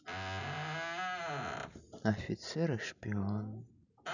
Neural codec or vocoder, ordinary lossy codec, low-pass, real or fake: none; none; 7.2 kHz; real